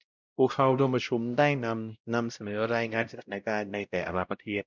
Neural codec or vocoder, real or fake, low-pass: codec, 16 kHz, 0.5 kbps, X-Codec, WavLM features, trained on Multilingual LibriSpeech; fake; 7.2 kHz